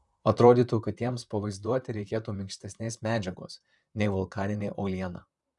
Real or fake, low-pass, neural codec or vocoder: fake; 10.8 kHz; vocoder, 44.1 kHz, 128 mel bands, Pupu-Vocoder